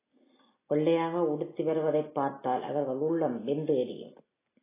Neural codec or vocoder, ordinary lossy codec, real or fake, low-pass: none; AAC, 16 kbps; real; 3.6 kHz